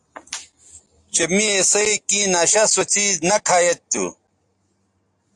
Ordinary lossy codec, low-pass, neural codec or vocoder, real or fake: AAC, 64 kbps; 10.8 kHz; none; real